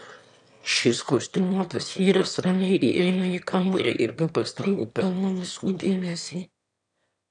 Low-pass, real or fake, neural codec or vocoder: 9.9 kHz; fake; autoencoder, 22.05 kHz, a latent of 192 numbers a frame, VITS, trained on one speaker